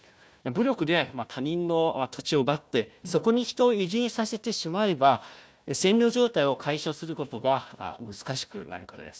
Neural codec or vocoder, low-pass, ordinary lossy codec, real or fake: codec, 16 kHz, 1 kbps, FunCodec, trained on Chinese and English, 50 frames a second; none; none; fake